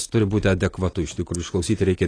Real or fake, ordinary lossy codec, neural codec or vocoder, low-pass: real; AAC, 32 kbps; none; 9.9 kHz